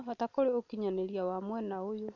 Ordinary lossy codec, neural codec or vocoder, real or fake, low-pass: none; none; real; 7.2 kHz